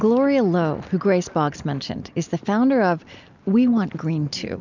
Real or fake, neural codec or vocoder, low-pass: real; none; 7.2 kHz